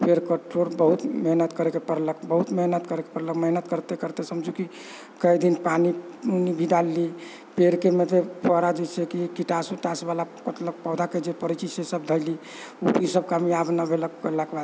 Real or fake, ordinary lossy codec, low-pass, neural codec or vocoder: real; none; none; none